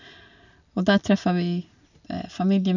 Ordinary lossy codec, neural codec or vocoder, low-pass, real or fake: none; none; 7.2 kHz; real